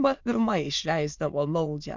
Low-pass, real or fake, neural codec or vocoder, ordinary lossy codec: 7.2 kHz; fake; autoencoder, 22.05 kHz, a latent of 192 numbers a frame, VITS, trained on many speakers; MP3, 64 kbps